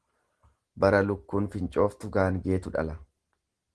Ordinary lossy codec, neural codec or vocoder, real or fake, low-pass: Opus, 16 kbps; none; real; 10.8 kHz